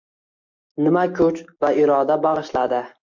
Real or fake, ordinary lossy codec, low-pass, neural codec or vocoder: real; MP3, 48 kbps; 7.2 kHz; none